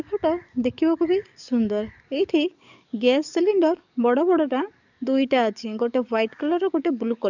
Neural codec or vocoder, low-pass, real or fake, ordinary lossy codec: codec, 44.1 kHz, 7.8 kbps, DAC; 7.2 kHz; fake; none